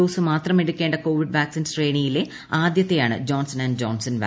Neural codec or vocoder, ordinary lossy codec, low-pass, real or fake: none; none; none; real